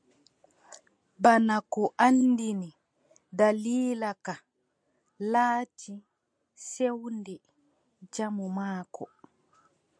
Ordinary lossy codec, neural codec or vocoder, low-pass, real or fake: MP3, 64 kbps; none; 9.9 kHz; real